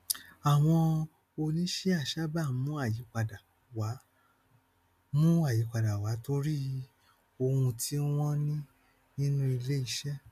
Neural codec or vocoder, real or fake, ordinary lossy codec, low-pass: none; real; none; 14.4 kHz